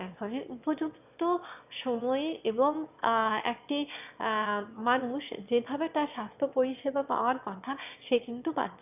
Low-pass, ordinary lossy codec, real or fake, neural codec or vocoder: 3.6 kHz; none; fake; autoencoder, 22.05 kHz, a latent of 192 numbers a frame, VITS, trained on one speaker